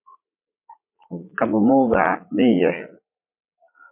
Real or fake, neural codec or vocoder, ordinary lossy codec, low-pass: fake; vocoder, 44.1 kHz, 80 mel bands, Vocos; MP3, 24 kbps; 3.6 kHz